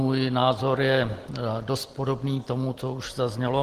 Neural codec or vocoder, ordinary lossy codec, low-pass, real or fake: none; Opus, 16 kbps; 14.4 kHz; real